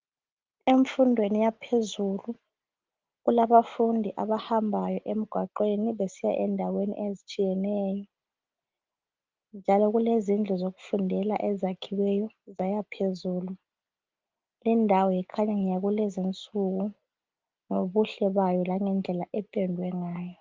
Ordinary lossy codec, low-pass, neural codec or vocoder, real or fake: Opus, 32 kbps; 7.2 kHz; none; real